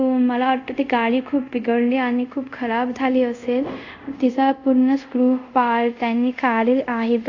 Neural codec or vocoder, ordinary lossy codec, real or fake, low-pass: codec, 24 kHz, 0.5 kbps, DualCodec; AAC, 48 kbps; fake; 7.2 kHz